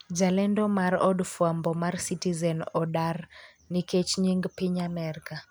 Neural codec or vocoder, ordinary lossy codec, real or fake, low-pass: none; none; real; none